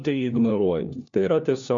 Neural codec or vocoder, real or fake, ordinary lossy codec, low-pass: codec, 16 kHz, 1 kbps, FunCodec, trained on LibriTTS, 50 frames a second; fake; MP3, 48 kbps; 7.2 kHz